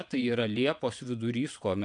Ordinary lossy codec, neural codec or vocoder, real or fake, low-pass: MP3, 96 kbps; vocoder, 22.05 kHz, 80 mel bands, WaveNeXt; fake; 9.9 kHz